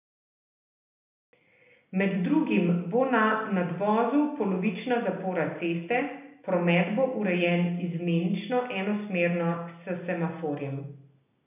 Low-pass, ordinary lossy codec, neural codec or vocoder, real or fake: 3.6 kHz; none; none; real